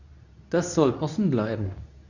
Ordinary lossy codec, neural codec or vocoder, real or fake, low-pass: none; codec, 24 kHz, 0.9 kbps, WavTokenizer, medium speech release version 2; fake; 7.2 kHz